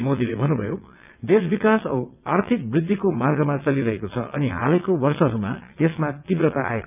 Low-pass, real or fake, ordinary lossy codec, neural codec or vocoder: 3.6 kHz; fake; none; vocoder, 22.05 kHz, 80 mel bands, WaveNeXt